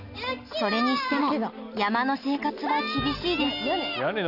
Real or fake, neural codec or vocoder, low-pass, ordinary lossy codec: real; none; 5.4 kHz; none